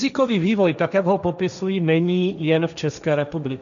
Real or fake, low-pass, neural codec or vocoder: fake; 7.2 kHz; codec, 16 kHz, 1.1 kbps, Voila-Tokenizer